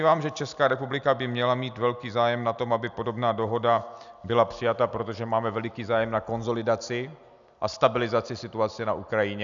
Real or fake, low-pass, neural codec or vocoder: real; 7.2 kHz; none